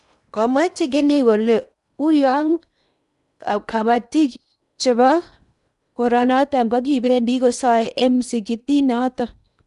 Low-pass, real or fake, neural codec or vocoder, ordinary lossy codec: 10.8 kHz; fake; codec, 16 kHz in and 24 kHz out, 0.8 kbps, FocalCodec, streaming, 65536 codes; none